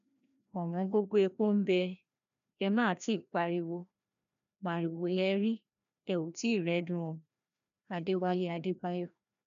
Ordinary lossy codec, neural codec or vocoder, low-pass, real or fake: none; codec, 16 kHz, 1 kbps, FreqCodec, larger model; 7.2 kHz; fake